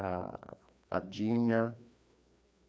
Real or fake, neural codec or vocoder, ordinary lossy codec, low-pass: fake; codec, 16 kHz, 2 kbps, FreqCodec, larger model; none; none